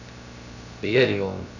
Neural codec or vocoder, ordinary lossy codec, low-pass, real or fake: codec, 16 kHz, 0.3 kbps, FocalCodec; none; 7.2 kHz; fake